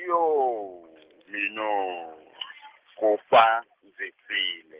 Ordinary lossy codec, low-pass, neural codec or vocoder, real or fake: Opus, 16 kbps; 3.6 kHz; none; real